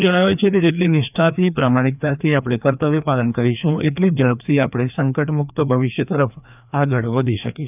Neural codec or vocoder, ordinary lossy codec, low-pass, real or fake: codec, 16 kHz, 2 kbps, FreqCodec, larger model; none; 3.6 kHz; fake